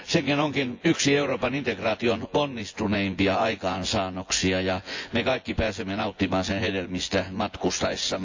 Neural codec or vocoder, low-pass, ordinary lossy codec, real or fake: vocoder, 24 kHz, 100 mel bands, Vocos; 7.2 kHz; AAC, 48 kbps; fake